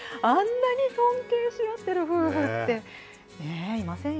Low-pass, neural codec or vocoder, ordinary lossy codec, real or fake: none; none; none; real